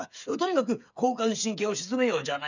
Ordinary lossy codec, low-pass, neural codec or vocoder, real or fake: none; 7.2 kHz; codec, 16 kHz, 8 kbps, FreqCodec, smaller model; fake